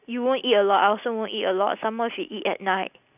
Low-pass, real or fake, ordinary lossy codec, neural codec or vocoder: 3.6 kHz; real; none; none